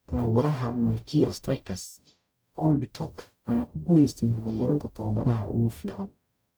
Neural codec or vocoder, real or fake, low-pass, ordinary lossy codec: codec, 44.1 kHz, 0.9 kbps, DAC; fake; none; none